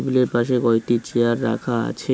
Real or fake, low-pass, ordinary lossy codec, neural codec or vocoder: real; none; none; none